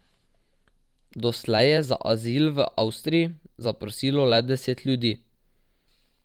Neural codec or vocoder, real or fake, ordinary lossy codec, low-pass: vocoder, 44.1 kHz, 128 mel bands every 512 samples, BigVGAN v2; fake; Opus, 24 kbps; 19.8 kHz